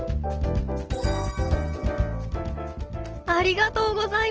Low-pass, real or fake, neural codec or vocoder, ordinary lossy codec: 7.2 kHz; real; none; Opus, 16 kbps